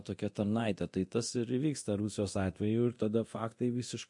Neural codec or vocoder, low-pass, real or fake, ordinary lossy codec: codec, 24 kHz, 0.9 kbps, DualCodec; 10.8 kHz; fake; MP3, 48 kbps